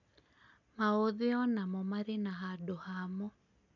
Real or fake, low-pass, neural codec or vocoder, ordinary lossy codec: real; 7.2 kHz; none; none